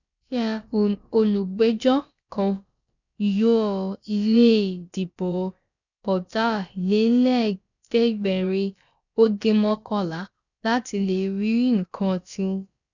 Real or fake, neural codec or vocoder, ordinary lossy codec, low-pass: fake; codec, 16 kHz, about 1 kbps, DyCAST, with the encoder's durations; none; 7.2 kHz